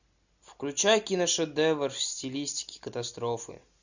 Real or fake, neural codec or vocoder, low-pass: real; none; 7.2 kHz